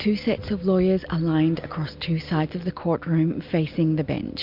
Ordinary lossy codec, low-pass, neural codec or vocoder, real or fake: MP3, 48 kbps; 5.4 kHz; none; real